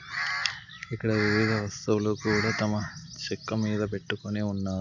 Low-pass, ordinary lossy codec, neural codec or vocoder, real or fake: 7.2 kHz; none; none; real